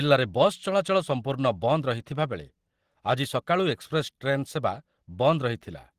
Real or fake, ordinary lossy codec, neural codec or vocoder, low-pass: real; Opus, 16 kbps; none; 14.4 kHz